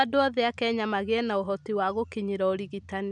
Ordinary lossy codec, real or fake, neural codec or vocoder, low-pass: none; real; none; 10.8 kHz